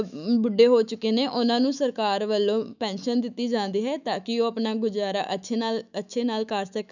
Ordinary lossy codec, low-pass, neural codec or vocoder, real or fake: none; 7.2 kHz; autoencoder, 48 kHz, 128 numbers a frame, DAC-VAE, trained on Japanese speech; fake